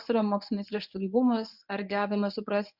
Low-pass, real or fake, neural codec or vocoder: 5.4 kHz; fake; codec, 24 kHz, 0.9 kbps, WavTokenizer, medium speech release version 1